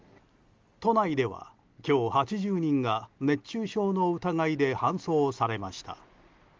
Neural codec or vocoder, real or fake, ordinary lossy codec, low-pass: none; real; Opus, 32 kbps; 7.2 kHz